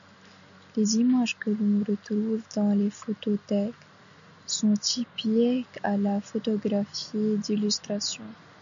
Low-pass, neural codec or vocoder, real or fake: 7.2 kHz; none; real